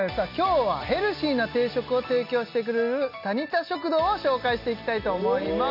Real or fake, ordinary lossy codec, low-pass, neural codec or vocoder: real; none; 5.4 kHz; none